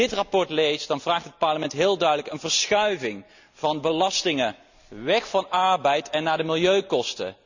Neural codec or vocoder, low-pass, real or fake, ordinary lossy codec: none; 7.2 kHz; real; none